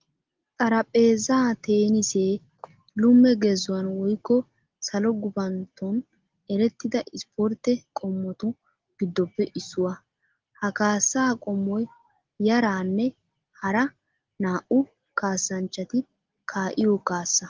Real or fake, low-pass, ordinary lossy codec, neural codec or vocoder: real; 7.2 kHz; Opus, 16 kbps; none